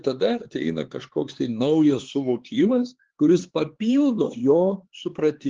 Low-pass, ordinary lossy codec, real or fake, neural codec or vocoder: 7.2 kHz; Opus, 16 kbps; fake; codec, 16 kHz, 4 kbps, X-Codec, HuBERT features, trained on LibriSpeech